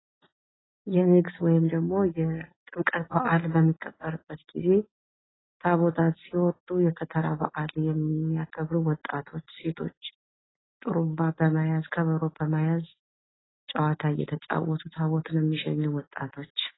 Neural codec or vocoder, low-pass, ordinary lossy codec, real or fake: none; 7.2 kHz; AAC, 16 kbps; real